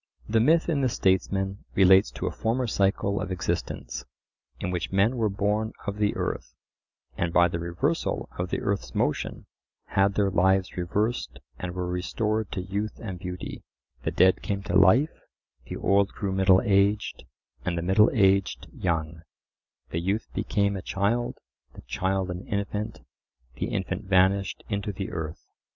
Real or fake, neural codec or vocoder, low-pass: real; none; 7.2 kHz